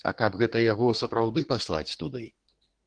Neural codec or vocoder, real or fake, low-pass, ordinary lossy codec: codec, 24 kHz, 1 kbps, SNAC; fake; 9.9 kHz; Opus, 16 kbps